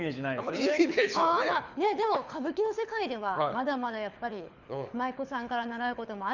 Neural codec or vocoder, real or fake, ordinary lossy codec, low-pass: codec, 24 kHz, 6 kbps, HILCodec; fake; Opus, 64 kbps; 7.2 kHz